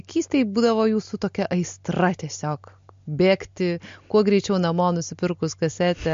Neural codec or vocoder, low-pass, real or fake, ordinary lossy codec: none; 7.2 kHz; real; MP3, 48 kbps